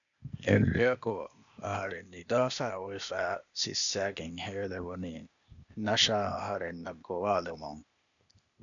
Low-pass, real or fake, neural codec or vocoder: 7.2 kHz; fake; codec, 16 kHz, 0.8 kbps, ZipCodec